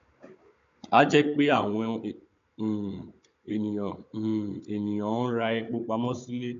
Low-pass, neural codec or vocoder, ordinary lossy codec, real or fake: 7.2 kHz; codec, 16 kHz, 16 kbps, FunCodec, trained on Chinese and English, 50 frames a second; MP3, 64 kbps; fake